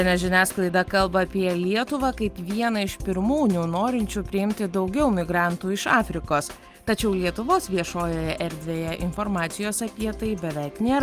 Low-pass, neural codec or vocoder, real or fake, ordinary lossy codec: 14.4 kHz; none; real; Opus, 32 kbps